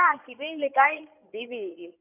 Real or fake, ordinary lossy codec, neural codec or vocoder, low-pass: fake; none; codec, 16 kHz in and 24 kHz out, 2.2 kbps, FireRedTTS-2 codec; 3.6 kHz